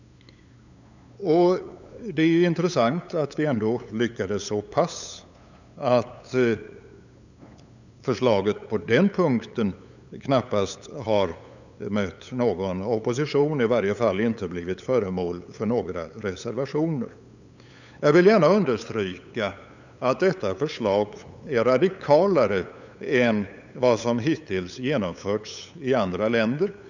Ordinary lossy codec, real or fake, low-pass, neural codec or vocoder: none; fake; 7.2 kHz; codec, 16 kHz, 8 kbps, FunCodec, trained on LibriTTS, 25 frames a second